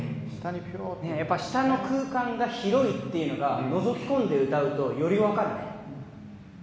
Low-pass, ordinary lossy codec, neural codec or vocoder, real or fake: none; none; none; real